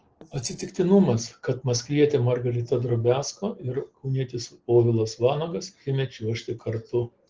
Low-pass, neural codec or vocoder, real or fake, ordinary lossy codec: 7.2 kHz; none; real; Opus, 16 kbps